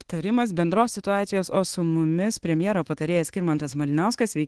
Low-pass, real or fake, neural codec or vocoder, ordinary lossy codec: 10.8 kHz; fake; codec, 24 kHz, 1.2 kbps, DualCodec; Opus, 16 kbps